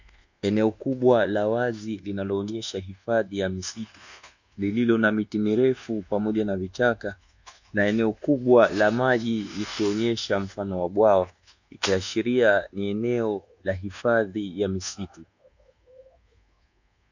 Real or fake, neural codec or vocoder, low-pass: fake; codec, 24 kHz, 1.2 kbps, DualCodec; 7.2 kHz